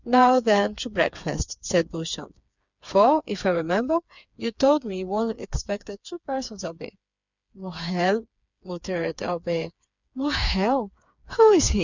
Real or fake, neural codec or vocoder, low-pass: fake; codec, 16 kHz, 4 kbps, FreqCodec, smaller model; 7.2 kHz